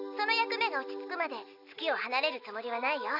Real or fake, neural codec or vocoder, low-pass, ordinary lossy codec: real; none; 5.4 kHz; none